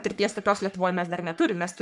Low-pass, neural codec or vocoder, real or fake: 10.8 kHz; codec, 44.1 kHz, 3.4 kbps, Pupu-Codec; fake